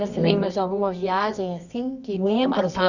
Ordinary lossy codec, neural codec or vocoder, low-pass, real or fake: none; codec, 24 kHz, 0.9 kbps, WavTokenizer, medium music audio release; 7.2 kHz; fake